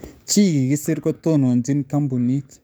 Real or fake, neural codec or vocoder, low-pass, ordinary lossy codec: fake; codec, 44.1 kHz, 7.8 kbps, DAC; none; none